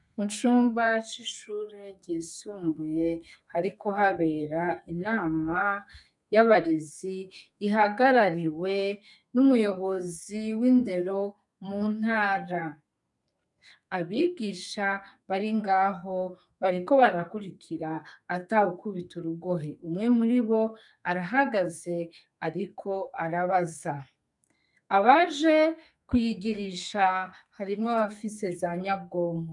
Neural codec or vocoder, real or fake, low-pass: codec, 32 kHz, 1.9 kbps, SNAC; fake; 10.8 kHz